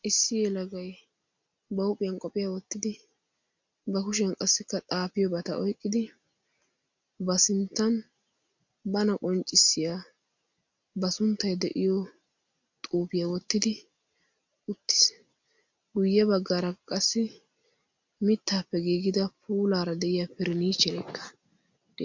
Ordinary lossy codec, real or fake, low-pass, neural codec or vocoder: MP3, 64 kbps; real; 7.2 kHz; none